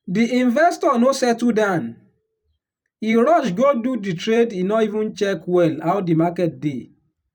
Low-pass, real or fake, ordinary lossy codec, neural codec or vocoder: 19.8 kHz; fake; none; vocoder, 44.1 kHz, 128 mel bands every 512 samples, BigVGAN v2